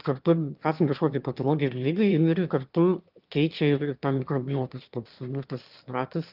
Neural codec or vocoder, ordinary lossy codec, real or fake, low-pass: autoencoder, 22.05 kHz, a latent of 192 numbers a frame, VITS, trained on one speaker; Opus, 32 kbps; fake; 5.4 kHz